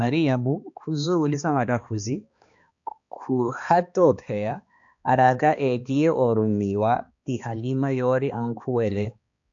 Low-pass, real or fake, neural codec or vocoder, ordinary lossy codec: 7.2 kHz; fake; codec, 16 kHz, 2 kbps, X-Codec, HuBERT features, trained on balanced general audio; AAC, 64 kbps